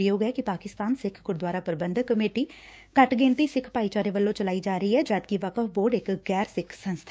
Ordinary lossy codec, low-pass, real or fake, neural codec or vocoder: none; none; fake; codec, 16 kHz, 6 kbps, DAC